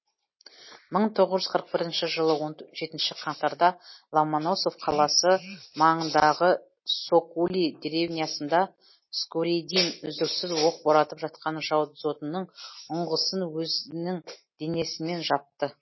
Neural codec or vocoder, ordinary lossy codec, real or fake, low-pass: none; MP3, 24 kbps; real; 7.2 kHz